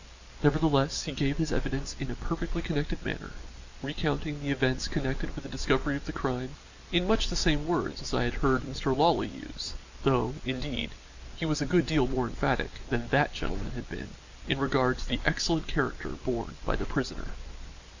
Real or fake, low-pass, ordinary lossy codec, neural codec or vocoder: real; 7.2 kHz; Opus, 64 kbps; none